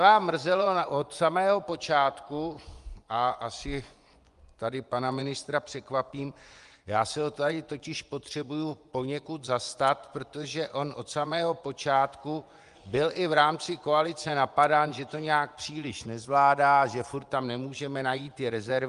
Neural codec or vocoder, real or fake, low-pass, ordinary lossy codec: vocoder, 24 kHz, 100 mel bands, Vocos; fake; 10.8 kHz; Opus, 32 kbps